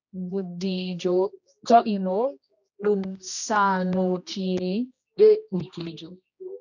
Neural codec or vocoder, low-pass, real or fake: codec, 16 kHz, 1 kbps, X-Codec, HuBERT features, trained on general audio; 7.2 kHz; fake